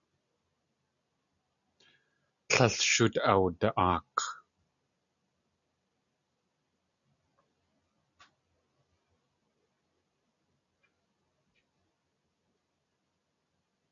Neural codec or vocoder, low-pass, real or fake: none; 7.2 kHz; real